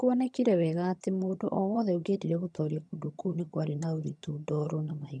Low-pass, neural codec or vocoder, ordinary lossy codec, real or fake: none; vocoder, 22.05 kHz, 80 mel bands, HiFi-GAN; none; fake